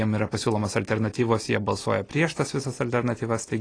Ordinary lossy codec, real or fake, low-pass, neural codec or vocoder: AAC, 32 kbps; real; 9.9 kHz; none